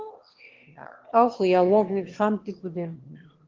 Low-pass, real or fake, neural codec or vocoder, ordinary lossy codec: 7.2 kHz; fake; autoencoder, 22.05 kHz, a latent of 192 numbers a frame, VITS, trained on one speaker; Opus, 16 kbps